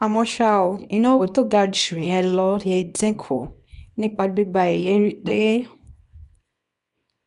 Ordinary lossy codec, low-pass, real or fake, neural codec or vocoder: none; 10.8 kHz; fake; codec, 24 kHz, 0.9 kbps, WavTokenizer, small release